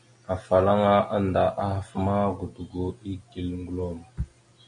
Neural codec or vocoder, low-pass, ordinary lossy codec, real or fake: none; 9.9 kHz; MP3, 48 kbps; real